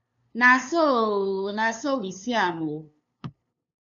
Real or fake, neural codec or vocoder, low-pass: fake; codec, 16 kHz, 2 kbps, FunCodec, trained on LibriTTS, 25 frames a second; 7.2 kHz